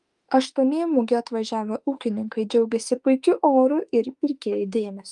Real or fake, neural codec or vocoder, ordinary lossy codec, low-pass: fake; autoencoder, 48 kHz, 32 numbers a frame, DAC-VAE, trained on Japanese speech; Opus, 32 kbps; 10.8 kHz